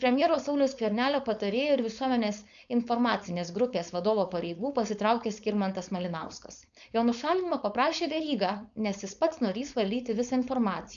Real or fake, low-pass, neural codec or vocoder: fake; 7.2 kHz; codec, 16 kHz, 4.8 kbps, FACodec